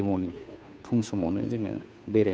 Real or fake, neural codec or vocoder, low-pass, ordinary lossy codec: fake; codec, 16 kHz, 2 kbps, FunCodec, trained on Chinese and English, 25 frames a second; none; none